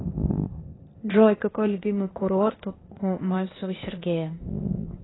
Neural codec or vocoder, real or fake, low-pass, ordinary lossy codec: codec, 16 kHz, 0.8 kbps, ZipCodec; fake; 7.2 kHz; AAC, 16 kbps